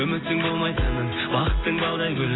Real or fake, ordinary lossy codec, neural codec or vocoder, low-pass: real; AAC, 16 kbps; none; 7.2 kHz